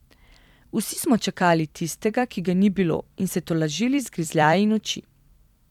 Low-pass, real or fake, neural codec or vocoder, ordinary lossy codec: 19.8 kHz; fake; vocoder, 44.1 kHz, 128 mel bands every 256 samples, BigVGAN v2; none